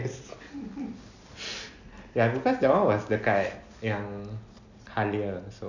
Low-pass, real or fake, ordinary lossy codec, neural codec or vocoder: 7.2 kHz; real; none; none